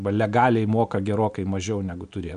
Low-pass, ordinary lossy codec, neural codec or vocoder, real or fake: 9.9 kHz; MP3, 96 kbps; none; real